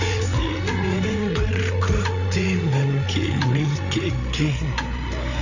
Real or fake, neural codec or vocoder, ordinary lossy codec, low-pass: fake; codec, 16 kHz, 8 kbps, FreqCodec, larger model; none; 7.2 kHz